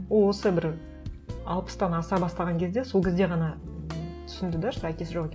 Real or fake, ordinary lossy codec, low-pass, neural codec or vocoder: real; none; none; none